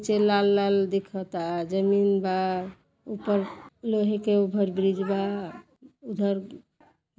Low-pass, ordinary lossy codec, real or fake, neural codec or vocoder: none; none; real; none